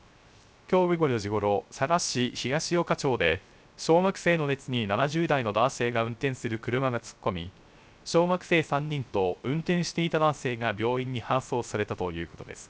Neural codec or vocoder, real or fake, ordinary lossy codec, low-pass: codec, 16 kHz, 0.3 kbps, FocalCodec; fake; none; none